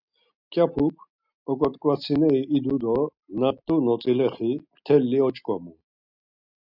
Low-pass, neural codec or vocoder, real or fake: 5.4 kHz; none; real